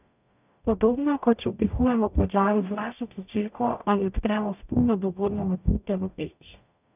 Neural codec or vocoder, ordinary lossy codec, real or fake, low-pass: codec, 44.1 kHz, 0.9 kbps, DAC; none; fake; 3.6 kHz